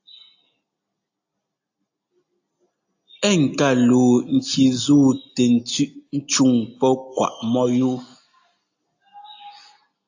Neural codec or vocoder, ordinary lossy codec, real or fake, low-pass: none; AAC, 48 kbps; real; 7.2 kHz